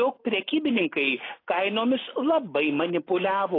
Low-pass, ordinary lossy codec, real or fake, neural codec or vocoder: 5.4 kHz; AAC, 24 kbps; real; none